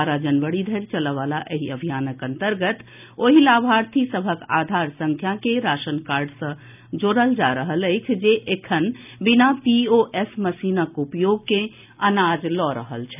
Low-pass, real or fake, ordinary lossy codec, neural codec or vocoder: 3.6 kHz; real; none; none